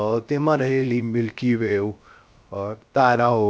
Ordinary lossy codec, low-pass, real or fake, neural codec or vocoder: none; none; fake; codec, 16 kHz, 0.3 kbps, FocalCodec